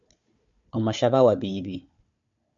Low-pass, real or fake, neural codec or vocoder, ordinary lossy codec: 7.2 kHz; fake; codec, 16 kHz, 4 kbps, FunCodec, trained on Chinese and English, 50 frames a second; MP3, 64 kbps